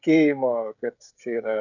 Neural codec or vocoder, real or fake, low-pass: codec, 16 kHz, 16 kbps, FreqCodec, smaller model; fake; 7.2 kHz